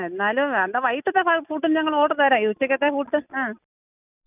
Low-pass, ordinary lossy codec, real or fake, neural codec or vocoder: 3.6 kHz; none; real; none